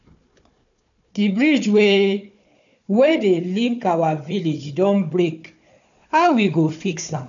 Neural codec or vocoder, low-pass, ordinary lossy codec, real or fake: codec, 16 kHz, 4 kbps, FunCodec, trained on Chinese and English, 50 frames a second; 7.2 kHz; none; fake